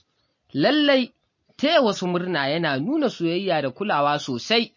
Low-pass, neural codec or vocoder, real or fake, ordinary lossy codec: 7.2 kHz; none; real; MP3, 32 kbps